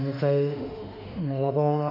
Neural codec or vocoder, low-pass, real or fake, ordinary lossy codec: autoencoder, 48 kHz, 32 numbers a frame, DAC-VAE, trained on Japanese speech; 5.4 kHz; fake; none